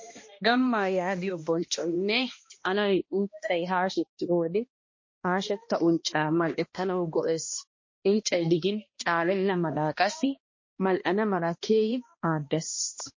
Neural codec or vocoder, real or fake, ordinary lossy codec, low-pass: codec, 16 kHz, 1 kbps, X-Codec, HuBERT features, trained on balanced general audio; fake; MP3, 32 kbps; 7.2 kHz